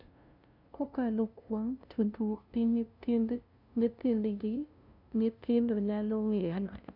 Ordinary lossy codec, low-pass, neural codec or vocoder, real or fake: none; 5.4 kHz; codec, 16 kHz, 0.5 kbps, FunCodec, trained on LibriTTS, 25 frames a second; fake